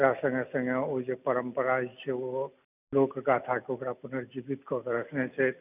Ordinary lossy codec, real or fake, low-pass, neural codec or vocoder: none; real; 3.6 kHz; none